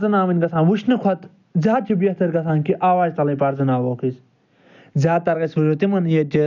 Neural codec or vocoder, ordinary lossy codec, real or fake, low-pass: none; none; real; 7.2 kHz